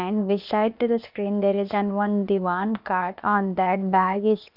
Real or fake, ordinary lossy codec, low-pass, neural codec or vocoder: fake; none; 5.4 kHz; codec, 16 kHz, 0.8 kbps, ZipCodec